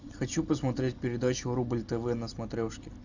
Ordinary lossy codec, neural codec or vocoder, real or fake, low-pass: Opus, 64 kbps; none; real; 7.2 kHz